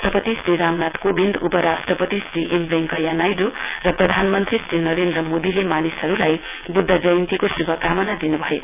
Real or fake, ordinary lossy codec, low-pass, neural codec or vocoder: fake; none; 3.6 kHz; vocoder, 22.05 kHz, 80 mel bands, WaveNeXt